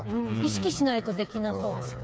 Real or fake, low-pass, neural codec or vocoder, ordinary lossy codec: fake; none; codec, 16 kHz, 4 kbps, FreqCodec, smaller model; none